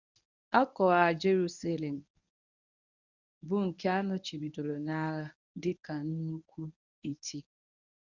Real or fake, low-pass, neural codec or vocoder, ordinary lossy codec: fake; 7.2 kHz; codec, 24 kHz, 0.9 kbps, WavTokenizer, medium speech release version 1; none